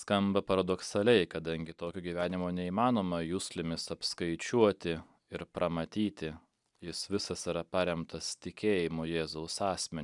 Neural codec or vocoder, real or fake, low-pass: none; real; 10.8 kHz